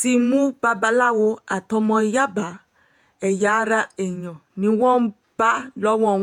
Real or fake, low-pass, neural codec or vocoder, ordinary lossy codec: fake; 19.8 kHz; vocoder, 48 kHz, 128 mel bands, Vocos; none